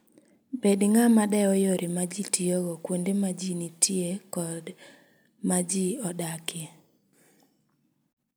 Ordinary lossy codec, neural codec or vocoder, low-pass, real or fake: none; none; none; real